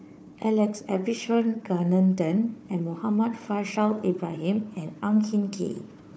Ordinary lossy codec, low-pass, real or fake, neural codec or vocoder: none; none; fake; codec, 16 kHz, 4 kbps, FunCodec, trained on Chinese and English, 50 frames a second